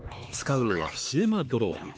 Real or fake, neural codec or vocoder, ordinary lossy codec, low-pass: fake; codec, 16 kHz, 2 kbps, X-Codec, HuBERT features, trained on LibriSpeech; none; none